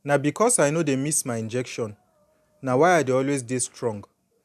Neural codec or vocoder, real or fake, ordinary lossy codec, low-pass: none; real; none; 14.4 kHz